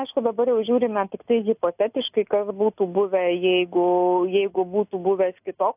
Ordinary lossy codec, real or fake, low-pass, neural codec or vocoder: AAC, 32 kbps; real; 3.6 kHz; none